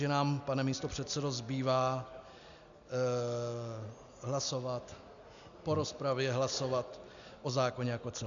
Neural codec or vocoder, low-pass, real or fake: none; 7.2 kHz; real